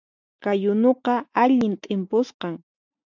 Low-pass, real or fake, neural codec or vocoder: 7.2 kHz; real; none